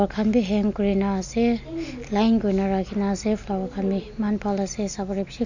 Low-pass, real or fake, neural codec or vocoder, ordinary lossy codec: 7.2 kHz; real; none; none